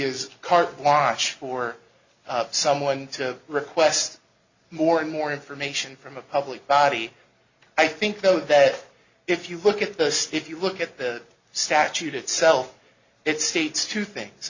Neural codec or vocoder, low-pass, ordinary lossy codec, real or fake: none; 7.2 kHz; Opus, 64 kbps; real